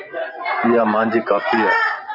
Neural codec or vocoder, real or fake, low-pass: vocoder, 24 kHz, 100 mel bands, Vocos; fake; 5.4 kHz